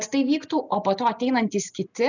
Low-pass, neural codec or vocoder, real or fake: 7.2 kHz; none; real